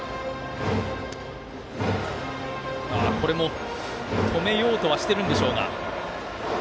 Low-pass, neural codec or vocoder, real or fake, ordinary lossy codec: none; none; real; none